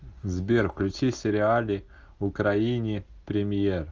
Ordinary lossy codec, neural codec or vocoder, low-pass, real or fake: Opus, 24 kbps; none; 7.2 kHz; real